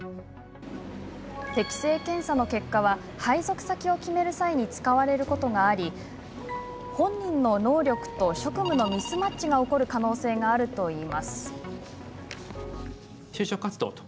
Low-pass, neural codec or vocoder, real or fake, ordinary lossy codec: none; none; real; none